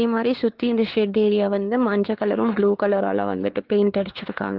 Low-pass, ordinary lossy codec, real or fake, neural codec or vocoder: 5.4 kHz; Opus, 16 kbps; fake; codec, 16 kHz, 4 kbps, X-Codec, WavLM features, trained on Multilingual LibriSpeech